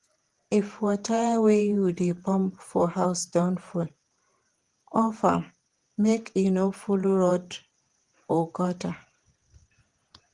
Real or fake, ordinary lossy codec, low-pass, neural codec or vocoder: fake; Opus, 24 kbps; 10.8 kHz; vocoder, 48 kHz, 128 mel bands, Vocos